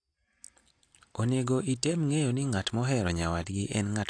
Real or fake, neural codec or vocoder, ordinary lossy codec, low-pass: real; none; AAC, 48 kbps; 9.9 kHz